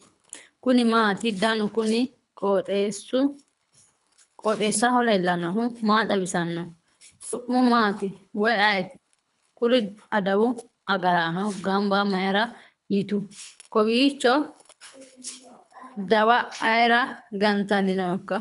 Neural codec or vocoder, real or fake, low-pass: codec, 24 kHz, 3 kbps, HILCodec; fake; 10.8 kHz